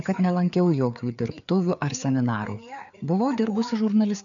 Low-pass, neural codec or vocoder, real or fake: 7.2 kHz; codec, 16 kHz, 4 kbps, FreqCodec, larger model; fake